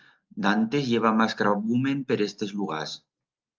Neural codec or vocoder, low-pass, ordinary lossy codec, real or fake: none; 7.2 kHz; Opus, 32 kbps; real